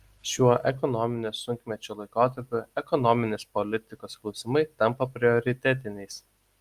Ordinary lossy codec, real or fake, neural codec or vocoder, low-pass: Opus, 32 kbps; real; none; 14.4 kHz